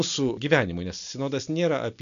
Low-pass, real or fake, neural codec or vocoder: 7.2 kHz; real; none